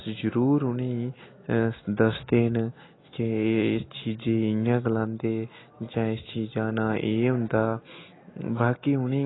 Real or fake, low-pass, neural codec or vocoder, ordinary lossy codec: real; 7.2 kHz; none; AAC, 16 kbps